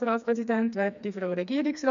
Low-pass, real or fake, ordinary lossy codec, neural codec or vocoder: 7.2 kHz; fake; none; codec, 16 kHz, 2 kbps, FreqCodec, smaller model